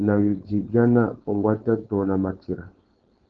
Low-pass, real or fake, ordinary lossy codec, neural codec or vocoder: 7.2 kHz; fake; Opus, 16 kbps; codec, 16 kHz, 4.8 kbps, FACodec